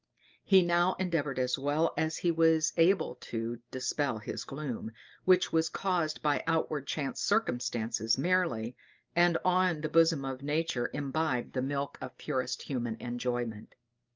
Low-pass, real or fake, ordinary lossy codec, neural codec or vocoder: 7.2 kHz; real; Opus, 32 kbps; none